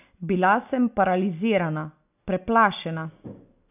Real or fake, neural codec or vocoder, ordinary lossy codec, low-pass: real; none; none; 3.6 kHz